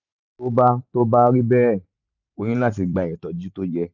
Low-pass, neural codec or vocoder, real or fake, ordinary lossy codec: 7.2 kHz; vocoder, 44.1 kHz, 128 mel bands every 512 samples, BigVGAN v2; fake; none